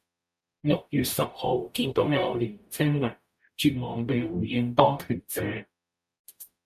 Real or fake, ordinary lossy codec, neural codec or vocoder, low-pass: fake; MP3, 96 kbps; codec, 44.1 kHz, 0.9 kbps, DAC; 14.4 kHz